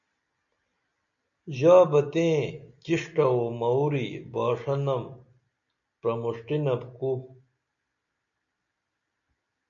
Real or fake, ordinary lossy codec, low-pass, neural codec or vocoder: real; MP3, 96 kbps; 7.2 kHz; none